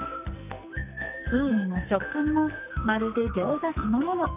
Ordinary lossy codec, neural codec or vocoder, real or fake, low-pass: none; codec, 44.1 kHz, 2.6 kbps, SNAC; fake; 3.6 kHz